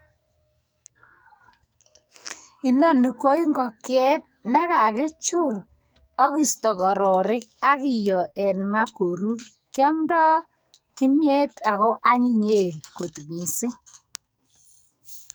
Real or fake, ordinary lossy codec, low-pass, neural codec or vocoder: fake; none; none; codec, 44.1 kHz, 2.6 kbps, SNAC